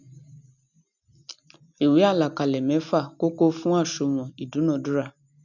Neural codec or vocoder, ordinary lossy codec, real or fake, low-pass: none; none; real; 7.2 kHz